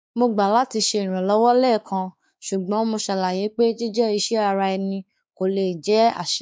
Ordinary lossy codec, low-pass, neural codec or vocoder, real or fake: none; none; codec, 16 kHz, 4 kbps, X-Codec, WavLM features, trained on Multilingual LibriSpeech; fake